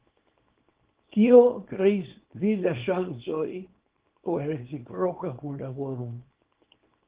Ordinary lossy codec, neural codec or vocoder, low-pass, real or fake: Opus, 16 kbps; codec, 24 kHz, 0.9 kbps, WavTokenizer, small release; 3.6 kHz; fake